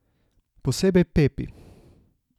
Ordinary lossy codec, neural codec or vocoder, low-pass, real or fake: none; none; 19.8 kHz; real